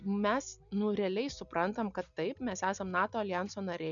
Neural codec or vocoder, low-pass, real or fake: none; 7.2 kHz; real